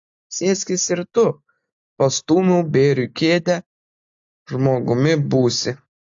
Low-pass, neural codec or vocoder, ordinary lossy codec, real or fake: 7.2 kHz; none; AAC, 64 kbps; real